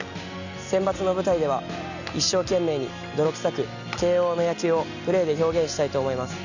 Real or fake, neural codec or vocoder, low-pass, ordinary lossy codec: real; none; 7.2 kHz; none